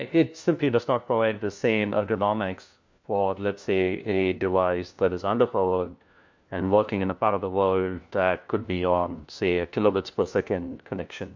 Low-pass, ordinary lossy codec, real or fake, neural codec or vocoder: 7.2 kHz; MP3, 64 kbps; fake; codec, 16 kHz, 1 kbps, FunCodec, trained on LibriTTS, 50 frames a second